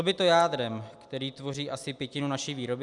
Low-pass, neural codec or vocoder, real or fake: 10.8 kHz; none; real